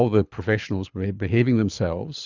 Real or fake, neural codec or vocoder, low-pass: fake; codec, 24 kHz, 6 kbps, HILCodec; 7.2 kHz